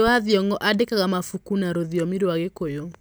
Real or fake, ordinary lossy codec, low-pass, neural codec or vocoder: real; none; none; none